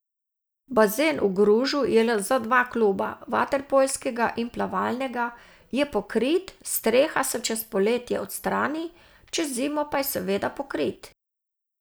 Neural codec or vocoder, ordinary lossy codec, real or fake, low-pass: none; none; real; none